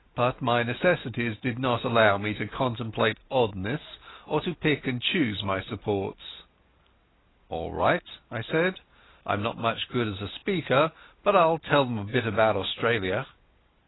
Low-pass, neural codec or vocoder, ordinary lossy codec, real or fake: 7.2 kHz; none; AAC, 16 kbps; real